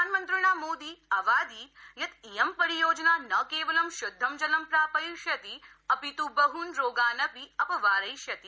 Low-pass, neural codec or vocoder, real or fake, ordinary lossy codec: none; none; real; none